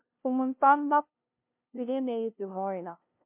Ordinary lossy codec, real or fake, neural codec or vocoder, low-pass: MP3, 32 kbps; fake; codec, 16 kHz, 0.5 kbps, FunCodec, trained on LibriTTS, 25 frames a second; 3.6 kHz